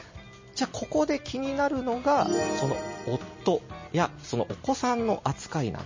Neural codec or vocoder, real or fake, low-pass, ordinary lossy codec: none; real; 7.2 kHz; MP3, 32 kbps